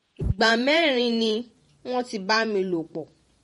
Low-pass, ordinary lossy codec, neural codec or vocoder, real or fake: 19.8 kHz; MP3, 48 kbps; vocoder, 48 kHz, 128 mel bands, Vocos; fake